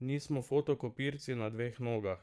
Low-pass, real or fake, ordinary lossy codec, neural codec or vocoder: none; fake; none; vocoder, 22.05 kHz, 80 mel bands, Vocos